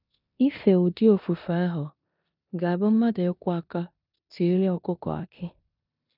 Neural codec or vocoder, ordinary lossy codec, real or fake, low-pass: codec, 16 kHz in and 24 kHz out, 0.9 kbps, LongCat-Audio-Codec, four codebook decoder; none; fake; 5.4 kHz